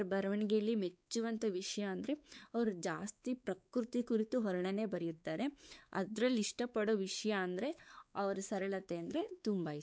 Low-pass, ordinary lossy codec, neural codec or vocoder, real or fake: none; none; codec, 16 kHz, 4 kbps, X-Codec, WavLM features, trained on Multilingual LibriSpeech; fake